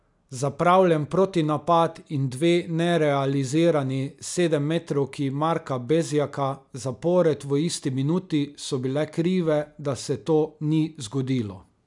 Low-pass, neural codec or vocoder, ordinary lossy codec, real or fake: 10.8 kHz; none; none; real